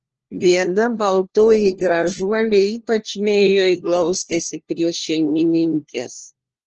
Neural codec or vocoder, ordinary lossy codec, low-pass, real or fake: codec, 16 kHz, 1 kbps, FunCodec, trained on LibriTTS, 50 frames a second; Opus, 16 kbps; 7.2 kHz; fake